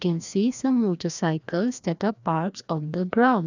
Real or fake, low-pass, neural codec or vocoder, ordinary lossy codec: fake; 7.2 kHz; codec, 16 kHz, 1 kbps, FreqCodec, larger model; none